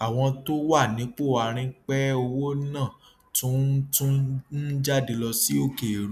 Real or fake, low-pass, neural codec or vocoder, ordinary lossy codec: real; 14.4 kHz; none; none